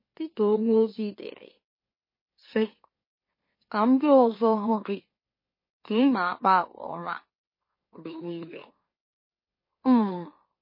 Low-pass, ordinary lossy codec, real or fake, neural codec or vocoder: 5.4 kHz; MP3, 24 kbps; fake; autoencoder, 44.1 kHz, a latent of 192 numbers a frame, MeloTTS